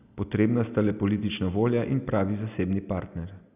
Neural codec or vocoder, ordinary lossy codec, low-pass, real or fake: none; none; 3.6 kHz; real